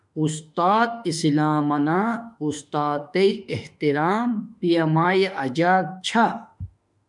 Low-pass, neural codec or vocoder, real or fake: 10.8 kHz; autoencoder, 48 kHz, 32 numbers a frame, DAC-VAE, trained on Japanese speech; fake